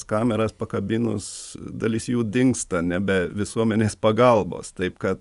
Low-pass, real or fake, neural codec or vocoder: 10.8 kHz; fake; vocoder, 24 kHz, 100 mel bands, Vocos